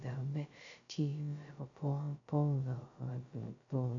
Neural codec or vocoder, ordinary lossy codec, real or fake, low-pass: codec, 16 kHz, 0.2 kbps, FocalCodec; MP3, 64 kbps; fake; 7.2 kHz